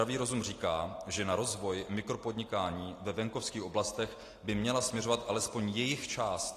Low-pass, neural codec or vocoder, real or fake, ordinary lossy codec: 14.4 kHz; none; real; AAC, 48 kbps